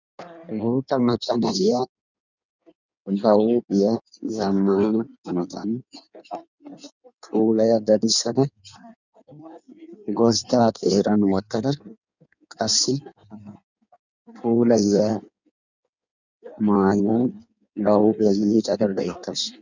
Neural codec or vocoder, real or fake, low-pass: codec, 16 kHz in and 24 kHz out, 1.1 kbps, FireRedTTS-2 codec; fake; 7.2 kHz